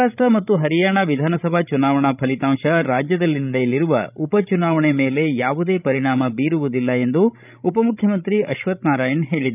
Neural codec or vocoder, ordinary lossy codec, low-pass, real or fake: codec, 16 kHz, 16 kbps, FreqCodec, larger model; none; 3.6 kHz; fake